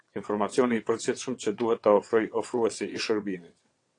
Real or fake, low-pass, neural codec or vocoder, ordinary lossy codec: fake; 9.9 kHz; vocoder, 22.05 kHz, 80 mel bands, WaveNeXt; AAC, 48 kbps